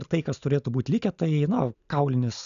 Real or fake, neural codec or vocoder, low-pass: real; none; 7.2 kHz